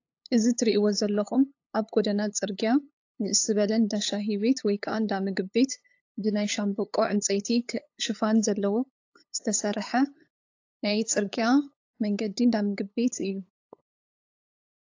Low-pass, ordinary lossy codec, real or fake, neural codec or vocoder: 7.2 kHz; AAC, 48 kbps; fake; codec, 16 kHz, 8 kbps, FunCodec, trained on LibriTTS, 25 frames a second